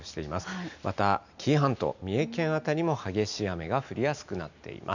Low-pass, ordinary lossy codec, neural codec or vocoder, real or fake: 7.2 kHz; none; none; real